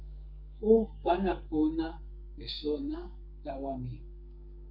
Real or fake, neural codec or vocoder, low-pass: fake; codec, 32 kHz, 1.9 kbps, SNAC; 5.4 kHz